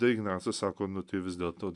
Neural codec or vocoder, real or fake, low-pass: none; real; 10.8 kHz